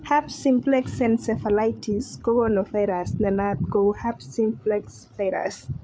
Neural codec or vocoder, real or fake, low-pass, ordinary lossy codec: codec, 16 kHz, 8 kbps, FreqCodec, larger model; fake; none; none